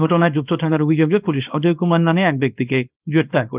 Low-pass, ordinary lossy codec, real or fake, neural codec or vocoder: 3.6 kHz; Opus, 32 kbps; fake; codec, 24 kHz, 0.9 kbps, WavTokenizer, small release